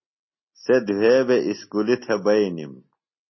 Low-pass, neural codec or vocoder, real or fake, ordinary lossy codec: 7.2 kHz; none; real; MP3, 24 kbps